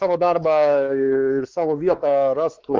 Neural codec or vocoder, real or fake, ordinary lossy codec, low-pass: codec, 16 kHz, 4 kbps, X-Codec, WavLM features, trained on Multilingual LibriSpeech; fake; Opus, 24 kbps; 7.2 kHz